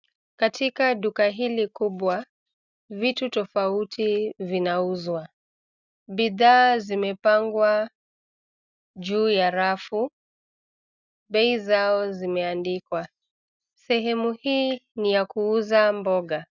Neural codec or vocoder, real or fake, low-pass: none; real; 7.2 kHz